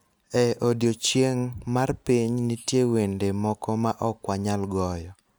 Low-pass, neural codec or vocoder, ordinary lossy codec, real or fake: none; none; none; real